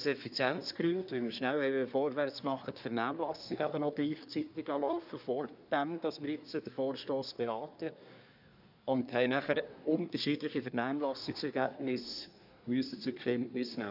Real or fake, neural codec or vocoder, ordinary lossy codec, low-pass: fake; codec, 24 kHz, 1 kbps, SNAC; none; 5.4 kHz